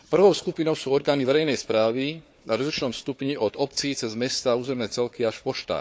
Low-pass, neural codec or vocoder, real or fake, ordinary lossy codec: none; codec, 16 kHz, 4 kbps, FunCodec, trained on Chinese and English, 50 frames a second; fake; none